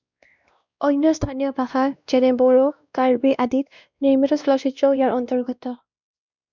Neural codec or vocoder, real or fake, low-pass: codec, 16 kHz, 1 kbps, X-Codec, WavLM features, trained on Multilingual LibriSpeech; fake; 7.2 kHz